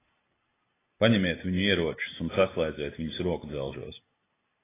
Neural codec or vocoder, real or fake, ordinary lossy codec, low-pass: none; real; AAC, 16 kbps; 3.6 kHz